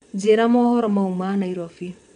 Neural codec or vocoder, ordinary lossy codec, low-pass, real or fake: vocoder, 22.05 kHz, 80 mel bands, WaveNeXt; none; 9.9 kHz; fake